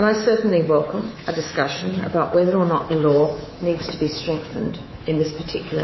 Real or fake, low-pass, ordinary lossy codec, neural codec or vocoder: fake; 7.2 kHz; MP3, 24 kbps; codec, 24 kHz, 3.1 kbps, DualCodec